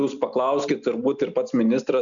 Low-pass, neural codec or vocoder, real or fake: 7.2 kHz; none; real